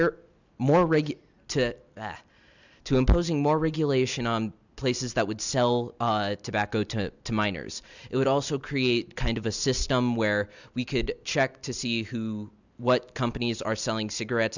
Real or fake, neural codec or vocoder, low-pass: real; none; 7.2 kHz